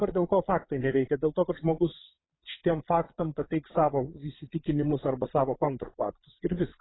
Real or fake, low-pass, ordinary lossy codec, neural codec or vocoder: fake; 7.2 kHz; AAC, 16 kbps; vocoder, 22.05 kHz, 80 mel bands, Vocos